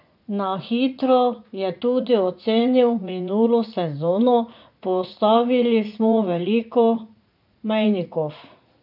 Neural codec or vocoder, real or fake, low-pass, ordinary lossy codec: vocoder, 44.1 kHz, 80 mel bands, Vocos; fake; 5.4 kHz; none